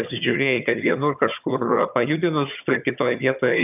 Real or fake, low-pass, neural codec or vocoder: fake; 3.6 kHz; vocoder, 22.05 kHz, 80 mel bands, HiFi-GAN